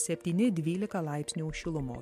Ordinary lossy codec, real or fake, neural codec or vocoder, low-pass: MP3, 64 kbps; real; none; 14.4 kHz